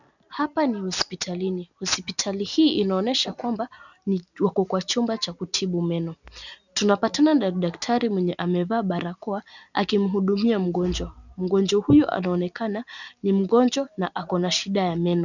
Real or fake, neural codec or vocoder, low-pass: real; none; 7.2 kHz